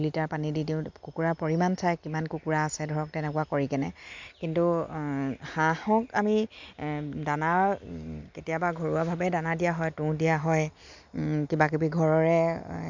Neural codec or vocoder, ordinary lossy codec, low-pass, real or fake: none; MP3, 64 kbps; 7.2 kHz; real